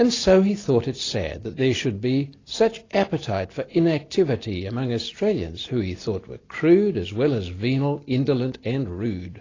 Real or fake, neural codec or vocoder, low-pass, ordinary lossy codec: real; none; 7.2 kHz; AAC, 32 kbps